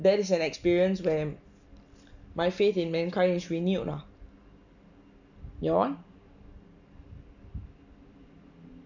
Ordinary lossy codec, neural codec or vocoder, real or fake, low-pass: none; none; real; 7.2 kHz